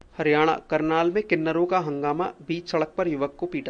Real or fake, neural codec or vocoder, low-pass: real; none; 9.9 kHz